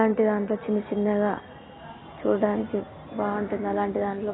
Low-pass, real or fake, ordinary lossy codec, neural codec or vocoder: 7.2 kHz; real; AAC, 16 kbps; none